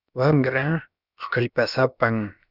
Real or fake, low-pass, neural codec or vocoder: fake; 5.4 kHz; codec, 16 kHz, about 1 kbps, DyCAST, with the encoder's durations